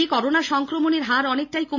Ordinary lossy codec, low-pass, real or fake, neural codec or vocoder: none; 7.2 kHz; real; none